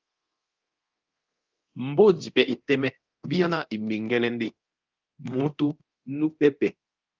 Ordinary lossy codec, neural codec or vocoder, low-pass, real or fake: Opus, 32 kbps; codec, 24 kHz, 0.9 kbps, DualCodec; 7.2 kHz; fake